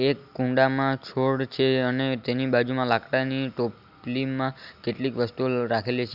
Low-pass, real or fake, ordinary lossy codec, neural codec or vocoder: 5.4 kHz; real; none; none